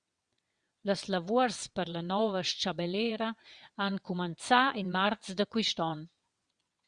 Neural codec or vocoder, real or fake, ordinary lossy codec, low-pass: vocoder, 22.05 kHz, 80 mel bands, WaveNeXt; fake; Opus, 64 kbps; 9.9 kHz